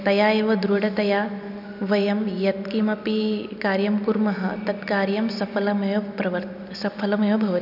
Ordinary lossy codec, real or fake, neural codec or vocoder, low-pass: none; real; none; 5.4 kHz